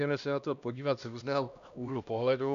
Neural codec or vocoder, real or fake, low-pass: codec, 16 kHz, 1 kbps, X-Codec, HuBERT features, trained on LibriSpeech; fake; 7.2 kHz